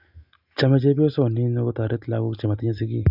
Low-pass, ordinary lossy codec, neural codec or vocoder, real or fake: 5.4 kHz; none; none; real